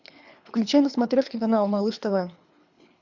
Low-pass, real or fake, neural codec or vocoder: 7.2 kHz; fake; codec, 24 kHz, 3 kbps, HILCodec